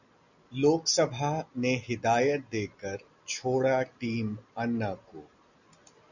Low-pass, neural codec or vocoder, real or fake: 7.2 kHz; none; real